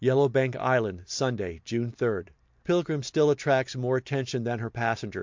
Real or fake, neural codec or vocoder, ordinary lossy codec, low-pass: real; none; MP3, 64 kbps; 7.2 kHz